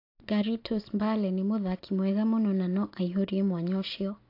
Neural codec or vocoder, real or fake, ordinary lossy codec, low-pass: none; real; AAC, 32 kbps; 5.4 kHz